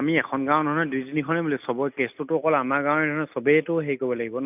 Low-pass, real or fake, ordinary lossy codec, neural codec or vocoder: 3.6 kHz; real; none; none